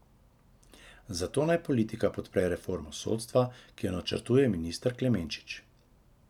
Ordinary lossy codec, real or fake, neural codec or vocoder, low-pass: none; real; none; 19.8 kHz